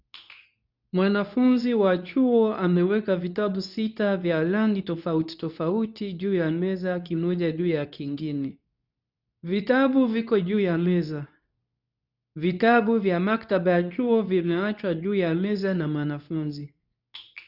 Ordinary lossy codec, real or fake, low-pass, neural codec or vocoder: none; fake; 5.4 kHz; codec, 24 kHz, 0.9 kbps, WavTokenizer, medium speech release version 2